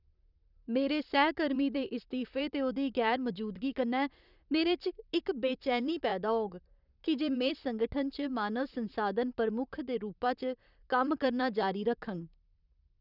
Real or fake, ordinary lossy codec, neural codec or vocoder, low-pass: fake; none; vocoder, 44.1 kHz, 128 mel bands, Pupu-Vocoder; 5.4 kHz